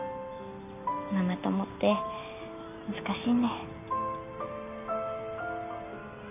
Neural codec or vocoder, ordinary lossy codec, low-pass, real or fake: none; none; 3.6 kHz; real